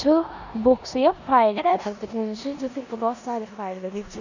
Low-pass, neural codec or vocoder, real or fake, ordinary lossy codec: 7.2 kHz; codec, 16 kHz in and 24 kHz out, 0.9 kbps, LongCat-Audio-Codec, four codebook decoder; fake; none